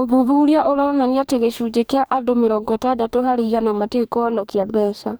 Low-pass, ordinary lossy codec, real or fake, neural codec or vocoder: none; none; fake; codec, 44.1 kHz, 2.6 kbps, DAC